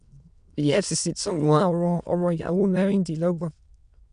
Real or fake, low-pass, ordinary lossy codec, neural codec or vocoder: fake; 9.9 kHz; none; autoencoder, 22.05 kHz, a latent of 192 numbers a frame, VITS, trained on many speakers